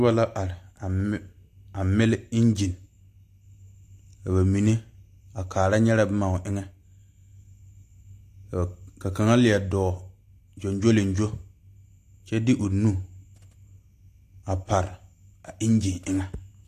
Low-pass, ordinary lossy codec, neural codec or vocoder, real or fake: 14.4 kHz; AAC, 64 kbps; none; real